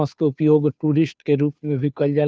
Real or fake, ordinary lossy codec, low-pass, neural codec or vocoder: fake; none; none; codec, 16 kHz, 2 kbps, FunCodec, trained on Chinese and English, 25 frames a second